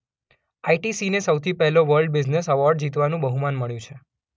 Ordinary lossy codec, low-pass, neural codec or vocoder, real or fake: none; none; none; real